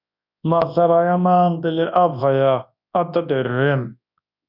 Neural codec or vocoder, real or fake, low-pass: codec, 24 kHz, 0.9 kbps, WavTokenizer, large speech release; fake; 5.4 kHz